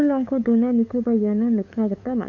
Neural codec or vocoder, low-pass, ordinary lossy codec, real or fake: codec, 16 kHz, 8 kbps, FunCodec, trained on LibriTTS, 25 frames a second; 7.2 kHz; none; fake